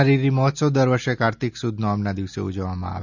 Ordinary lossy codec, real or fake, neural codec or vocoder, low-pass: none; real; none; 7.2 kHz